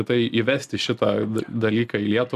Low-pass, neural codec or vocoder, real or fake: 14.4 kHz; none; real